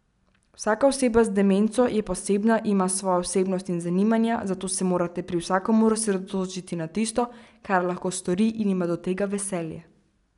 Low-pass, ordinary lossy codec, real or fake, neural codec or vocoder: 10.8 kHz; none; real; none